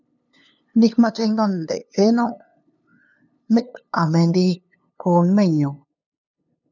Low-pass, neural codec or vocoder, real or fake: 7.2 kHz; codec, 16 kHz, 2 kbps, FunCodec, trained on LibriTTS, 25 frames a second; fake